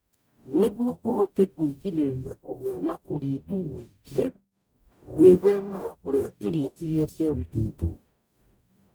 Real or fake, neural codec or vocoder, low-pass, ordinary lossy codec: fake; codec, 44.1 kHz, 0.9 kbps, DAC; none; none